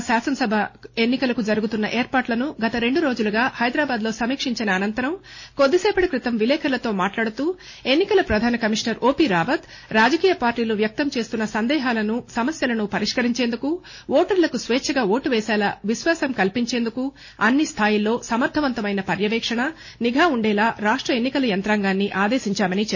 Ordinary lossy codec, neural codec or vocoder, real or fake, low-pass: MP3, 32 kbps; none; real; 7.2 kHz